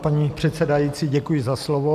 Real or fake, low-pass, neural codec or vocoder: real; 14.4 kHz; none